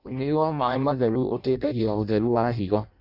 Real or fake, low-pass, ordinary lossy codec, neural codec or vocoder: fake; 5.4 kHz; none; codec, 16 kHz in and 24 kHz out, 0.6 kbps, FireRedTTS-2 codec